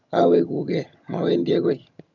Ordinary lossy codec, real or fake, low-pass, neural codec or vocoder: none; fake; 7.2 kHz; vocoder, 22.05 kHz, 80 mel bands, HiFi-GAN